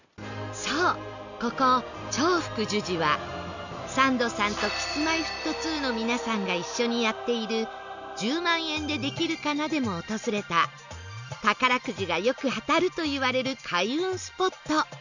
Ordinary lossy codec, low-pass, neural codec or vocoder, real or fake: none; 7.2 kHz; none; real